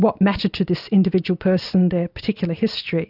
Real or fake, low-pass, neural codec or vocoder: real; 5.4 kHz; none